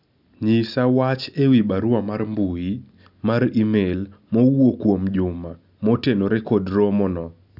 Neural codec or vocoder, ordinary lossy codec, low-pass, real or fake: none; none; 5.4 kHz; real